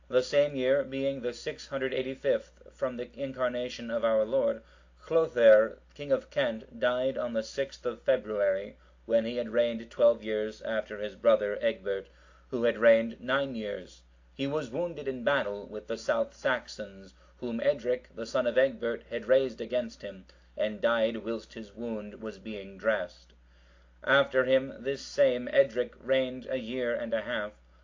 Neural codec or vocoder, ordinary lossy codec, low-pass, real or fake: none; AAC, 48 kbps; 7.2 kHz; real